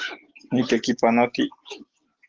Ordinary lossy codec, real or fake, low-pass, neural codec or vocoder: Opus, 16 kbps; real; 7.2 kHz; none